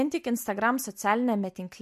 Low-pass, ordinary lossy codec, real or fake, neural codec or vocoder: 14.4 kHz; MP3, 64 kbps; real; none